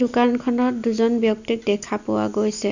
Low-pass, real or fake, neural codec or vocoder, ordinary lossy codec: 7.2 kHz; real; none; none